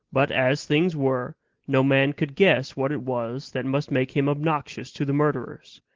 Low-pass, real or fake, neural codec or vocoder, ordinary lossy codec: 7.2 kHz; real; none; Opus, 16 kbps